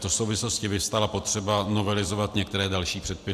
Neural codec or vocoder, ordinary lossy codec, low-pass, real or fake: none; AAC, 96 kbps; 14.4 kHz; real